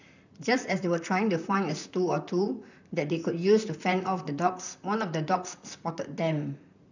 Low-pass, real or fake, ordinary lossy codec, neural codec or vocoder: 7.2 kHz; fake; none; vocoder, 44.1 kHz, 128 mel bands, Pupu-Vocoder